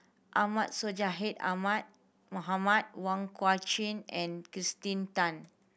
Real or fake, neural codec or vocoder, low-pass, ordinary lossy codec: real; none; none; none